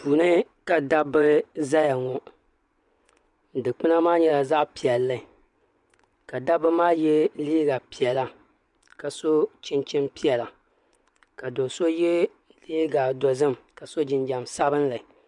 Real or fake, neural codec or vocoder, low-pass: fake; vocoder, 24 kHz, 100 mel bands, Vocos; 10.8 kHz